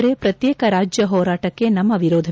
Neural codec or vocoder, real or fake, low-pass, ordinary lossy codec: none; real; none; none